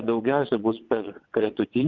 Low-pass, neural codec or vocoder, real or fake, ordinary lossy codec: 7.2 kHz; vocoder, 22.05 kHz, 80 mel bands, WaveNeXt; fake; Opus, 32 kbps